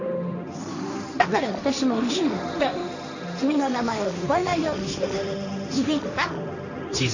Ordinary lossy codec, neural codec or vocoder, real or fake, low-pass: none; codec, 16 kHz, 1.1 kbps, Voila-Tokenizer; fake; 7.2 kHz